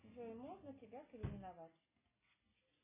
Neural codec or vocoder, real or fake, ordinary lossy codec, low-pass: none; real; MP3, 16 kbps; 3.6 kHz